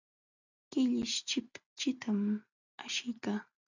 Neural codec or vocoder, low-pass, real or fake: none; 7.2 kHz; real